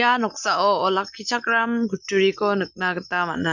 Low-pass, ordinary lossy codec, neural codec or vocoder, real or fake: 7.2 kHz; none; none; real